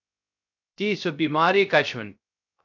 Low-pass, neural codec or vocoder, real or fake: 7.2 kHz; codec, 16 kHz, 0.3 kbps, FocalCodec; fake